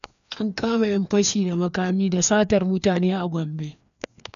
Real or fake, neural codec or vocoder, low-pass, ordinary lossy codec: fake; codec, 16 kHz, 2 kbps, FreqCodec, larger model; 7.2 kHz; none